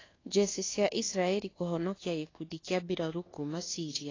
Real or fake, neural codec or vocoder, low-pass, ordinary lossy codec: fake; codec, 24 kHz, 1.2 kbps, DualCodec; 7.2 kHz; AAC, 32 kbps